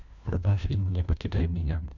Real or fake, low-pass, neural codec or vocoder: fake; 7.2 kHz; codec, 16 kHz, 1 kbps, FunCodec, trained on LibriTTS, 50 frames a second